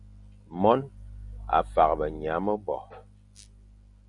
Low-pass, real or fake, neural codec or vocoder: 10.8 kHz; real; none